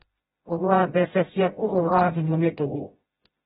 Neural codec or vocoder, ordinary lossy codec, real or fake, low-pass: codec, 16 kHz, 0.5 kbps, FreqCodec, smaller model; AAC, 16 kbps; fake; 7.2 kHz